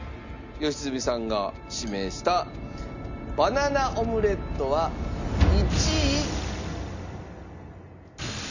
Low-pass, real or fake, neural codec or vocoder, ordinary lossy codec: 7.2 kHz; real; none; none